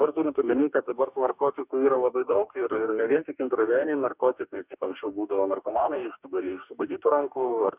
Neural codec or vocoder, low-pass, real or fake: codec, 44.1 kHz, 2.6 kbps, DAC; 3.6 kHz; fake